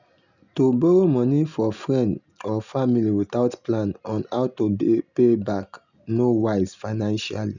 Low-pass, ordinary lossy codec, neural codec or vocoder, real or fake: 7.2 kHz; none; none; real